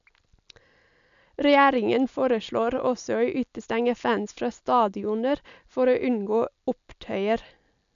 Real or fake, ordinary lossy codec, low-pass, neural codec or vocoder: real; MP3, 96 kbps; 7.2 kHz; none